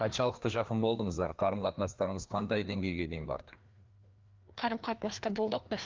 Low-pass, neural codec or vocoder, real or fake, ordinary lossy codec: 7.2 kHz; codec, 16 kHz, 2 kbps, FreqCodec, larger model; fake; Opus, 32 kbps